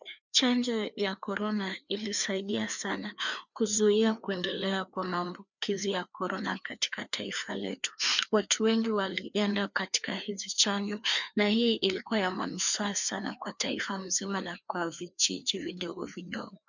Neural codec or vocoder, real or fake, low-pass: codec, 16 kHz, 2 kbps, FreqCodec, larger model; fake; 7.2 kHz